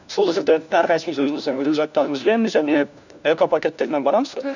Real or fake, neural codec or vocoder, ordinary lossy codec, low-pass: fake; codec, 16 kHz, 1 kbps, FunCodec, trained on LibriTTS, 50 frames a second; none; 7.2 kHz